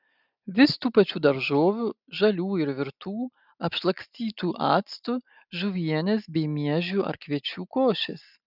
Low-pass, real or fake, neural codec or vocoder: 5.4 kHz; real; none